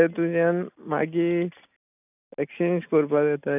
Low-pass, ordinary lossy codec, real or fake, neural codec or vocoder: 3.6 kHz; none; real; none